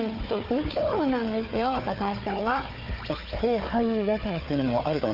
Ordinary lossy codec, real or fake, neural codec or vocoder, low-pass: Opus, 24 kbps; fake; codec, 16 kHz, 4 kbps, FunCodec, trained on Chinese and English, 50 frames a second; 5.4 kHz